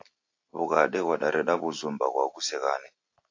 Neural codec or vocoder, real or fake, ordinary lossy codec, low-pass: none; real; AAC, 48 kbps; 7.2 kHz